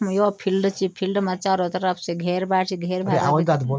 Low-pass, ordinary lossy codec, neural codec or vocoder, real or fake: none; none; none; real